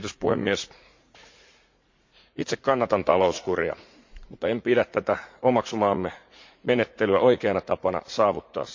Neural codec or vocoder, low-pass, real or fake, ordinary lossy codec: vocoder, 44.1 kHz, 80 mel bands, Vocos; 7.2 kHz; fake; MP3, 48 kbps